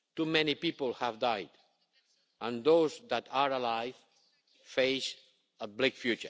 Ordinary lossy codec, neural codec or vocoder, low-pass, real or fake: none; none; none; real